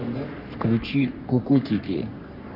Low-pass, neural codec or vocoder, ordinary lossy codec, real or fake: 5.4 kHz; codec, 44.1 kHz, 3.4 kbps, Pupu-Codec; none; fake